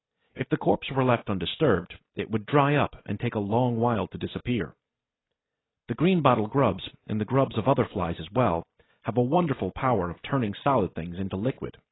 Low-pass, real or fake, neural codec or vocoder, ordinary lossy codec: 7.2 kHz; real; none; AAC, 16 kbps